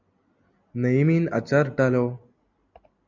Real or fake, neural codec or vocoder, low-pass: real; none; 7.2 kHz